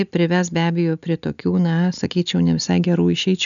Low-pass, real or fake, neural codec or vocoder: 7.2 kHz; real; none